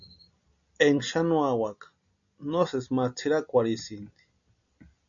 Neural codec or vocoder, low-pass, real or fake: none; 7.2 kHz; real